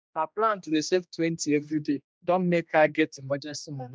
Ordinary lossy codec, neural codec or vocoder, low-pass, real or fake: none; codec, 16 kHz, 1 kbps, X-Codec, HuBERT features, trained on general audio; none; fake